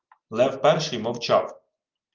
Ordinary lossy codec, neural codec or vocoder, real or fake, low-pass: Opus, 32 kbps; none; real; 7.2 kHz